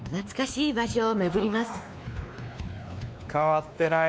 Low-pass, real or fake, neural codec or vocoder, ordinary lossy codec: none; fake; codec, 16 kHz, 2 kbps, X-Codec, WavLM features, trained on Multilingual LibriSpeech; none